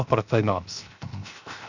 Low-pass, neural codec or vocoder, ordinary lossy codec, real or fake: 7.2 kHz; codec, 16 kHz, 0.7 kbps, FocalCodec; Opus, 64 kbps; fake